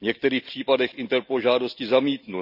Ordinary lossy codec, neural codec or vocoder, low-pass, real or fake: none; none; 5.4 kHz; real